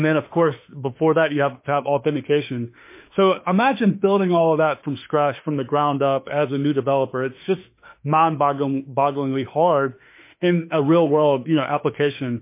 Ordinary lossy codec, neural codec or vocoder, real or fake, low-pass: MP3, 24 kbps; autoencoder, 48 kHz, 32 numbers a frame, DAC-VAE, trained on Japanese speech; fake; 3.6 kHz